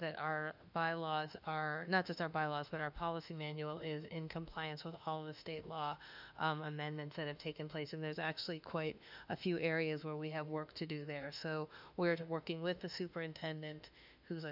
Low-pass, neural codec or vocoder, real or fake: 5.4 kHz; autoencoder, 48 kHz, 32 numbers a frame, DAC-VAE, trained on Japanese speech; fake